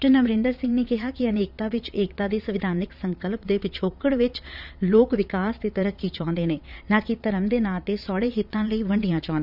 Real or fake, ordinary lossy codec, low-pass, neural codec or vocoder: fake; none; 5.4 kHz; vocoder, 22.05 kHz, 80 mel bands, Vocos